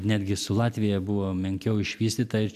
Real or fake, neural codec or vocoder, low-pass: real; none; 14.4 kHz